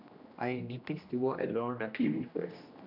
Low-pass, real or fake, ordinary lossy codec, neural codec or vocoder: 5.4 kHz; fake; none; codec, 16 kHz, 1 kbps, X-Codec, HuBERT features, trained on general audio